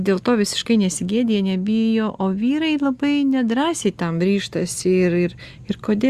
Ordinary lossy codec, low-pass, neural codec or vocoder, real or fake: Opus, 64 kbps; 14.4 kHz; none; real